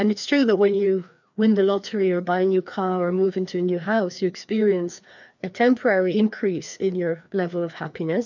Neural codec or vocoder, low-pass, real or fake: codec, 16 kHz, 2 kbps, FreqCodec, larger model; 7.2 kHz; fake